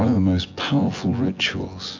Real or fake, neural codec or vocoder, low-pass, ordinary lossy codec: fake; vocoder, 24 kHz, 100 mel bands, Vocos; 7.2 kHz; AAC, 48 kbps